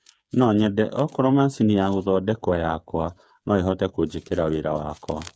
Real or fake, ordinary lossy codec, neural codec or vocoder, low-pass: fake; none; codec, 16 kHz, 8 kbps, FreqCodec, smaller model; none